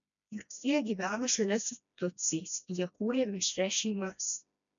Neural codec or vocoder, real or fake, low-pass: codec, 16 kHz, 1 kbps, FreqCodec, smaller model; fake; 7.2 kHz